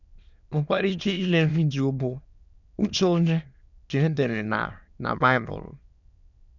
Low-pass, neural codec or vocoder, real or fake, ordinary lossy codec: 7.2 kHz; autoencoder, 22.05 kHz, a latent of 192 numbers a frame, VITS, trained on many speakers; fake; none